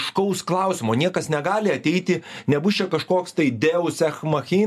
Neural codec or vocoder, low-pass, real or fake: none; 14.4 kHz; real